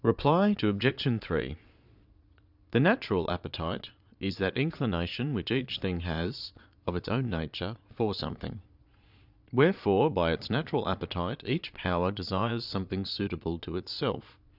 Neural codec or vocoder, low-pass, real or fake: vocoder, 22.05 kHz, 80 mel bands, Vocos; 5.4 kHz; fake